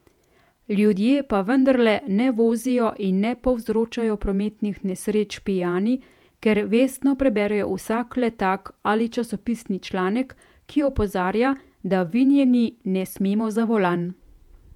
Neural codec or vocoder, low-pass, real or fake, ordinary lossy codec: vocoder, 48 kHz, 128 mel bands, Vocos; 19.8 kHz; fake; MP3, 96 kbps